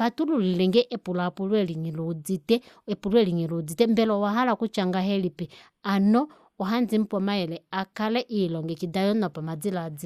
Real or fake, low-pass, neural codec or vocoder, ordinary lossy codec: real; 14.4 kHz; none; Opus, 64 kbps